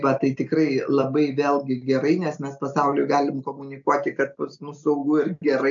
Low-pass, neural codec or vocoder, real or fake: 7.2 kHz; none; real